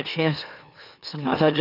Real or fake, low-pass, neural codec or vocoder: fake; 5.4 kHz; autoencoder, 44.1 kHz, a latent of 192 numbers a frame, MeloTTS